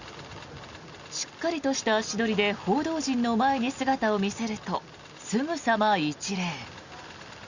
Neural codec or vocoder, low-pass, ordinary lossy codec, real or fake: none; 7.2 kHz; Opus, 64 kbps; real